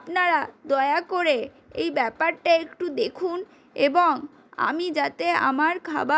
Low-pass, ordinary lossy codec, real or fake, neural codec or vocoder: none; none; real; none